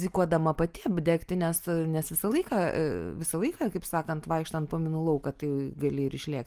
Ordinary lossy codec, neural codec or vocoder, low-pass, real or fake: Opus, 32 kbps; none; 14.4 kHz; real